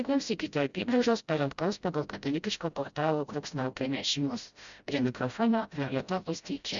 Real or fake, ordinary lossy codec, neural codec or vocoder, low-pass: fake; Opus, 64 kbps; codec, 16 kHz, 0.5 kbps, FreqCodec, smaller model; 7.2 kHz